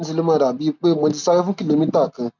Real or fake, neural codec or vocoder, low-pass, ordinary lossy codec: real; none; 7.2 kHz; none